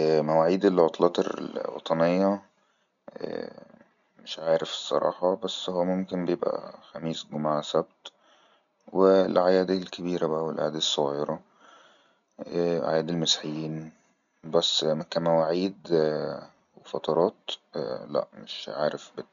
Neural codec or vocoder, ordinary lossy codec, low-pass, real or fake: none; MP3, 96 kbps; 7.2 kHz; real